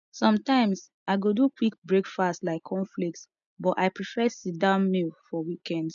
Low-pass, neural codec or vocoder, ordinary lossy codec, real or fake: 7.2 kHz; none; none; real